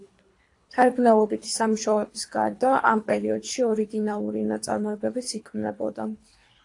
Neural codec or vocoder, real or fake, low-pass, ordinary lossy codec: codec, 24 kHz, 3 kbps, HILCodec; fake; 10.8 kHz; AAC, 48 kbps